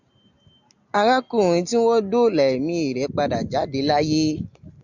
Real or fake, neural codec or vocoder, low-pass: real; none; 7.2 kHz